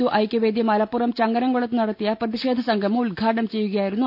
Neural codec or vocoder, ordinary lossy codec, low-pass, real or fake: none; none; 5.4 kHz; real